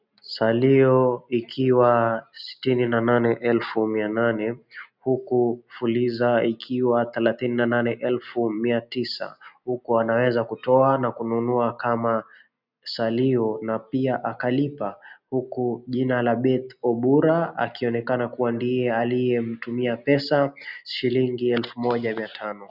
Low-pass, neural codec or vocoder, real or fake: 5.4 kHz; none; real